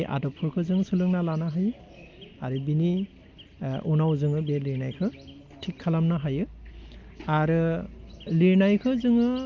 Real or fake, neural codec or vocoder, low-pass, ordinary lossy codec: real; none; 7.2 kHz; Opus, 32 kbps